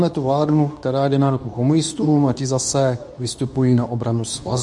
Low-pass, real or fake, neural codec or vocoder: 10.8 kHz; fake; codec, 24 kHz, 0.9 kbps, WavTokenizer, medium speech release version 2